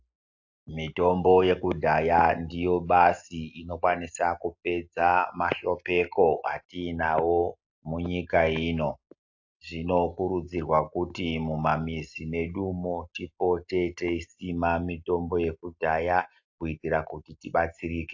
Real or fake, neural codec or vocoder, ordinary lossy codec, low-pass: real; none; AAC, 48 kbps; 7.2 kHz